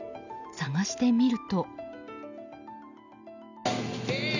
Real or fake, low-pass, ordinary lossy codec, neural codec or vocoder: real; 7.2 kHz; none; none